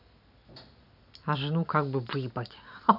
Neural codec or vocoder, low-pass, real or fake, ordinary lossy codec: none; 5.4 kHz; real; none